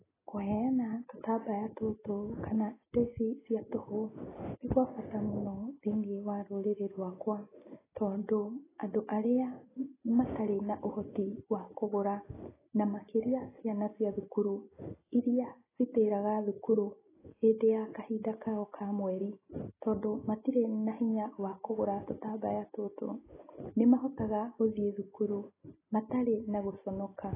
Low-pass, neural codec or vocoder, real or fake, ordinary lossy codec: 3.6 kHz; none; real; AAC, 16 kbps